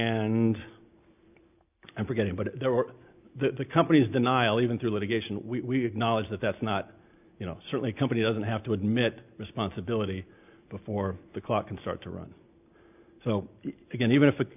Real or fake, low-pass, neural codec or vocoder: real; 3.6 kHz; none